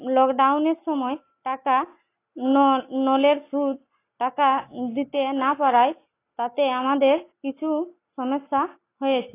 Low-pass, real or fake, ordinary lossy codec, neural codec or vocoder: 3.6 kHz; real; AAC, 24 kbps; none